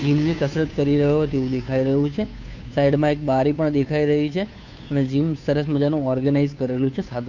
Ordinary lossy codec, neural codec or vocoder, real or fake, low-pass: none; codec, 16 kHz, 2 kbps, FunCodec, trained on Chinese and English, 25 frames a second; fake; 7.2 kHz